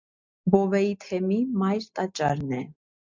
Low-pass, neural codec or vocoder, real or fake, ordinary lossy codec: 7.2 kHz; none; real; MP3, 64 kbps